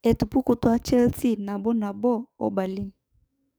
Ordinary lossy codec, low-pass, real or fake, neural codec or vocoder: none; none; fake; codec, 44.1 kHz, 7.8 kbps, DAC